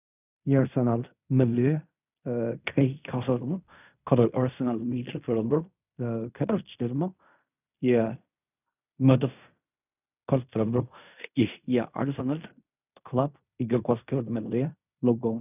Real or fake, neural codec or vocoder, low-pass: fake; codec, 16 kHz in and 24 kHz out, 0.4 kbps, LongCat-Audio-Codec, fine tuned four codebook decoder; 3.6 kHz